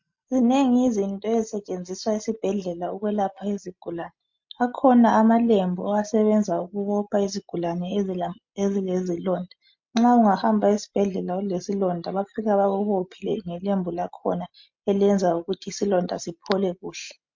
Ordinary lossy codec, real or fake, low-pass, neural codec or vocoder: MP3, 48 kbps; real; 7.2 kHz; none